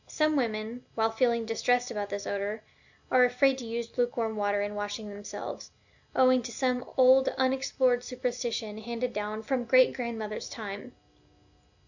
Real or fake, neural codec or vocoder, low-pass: real; none; 7.2 kHz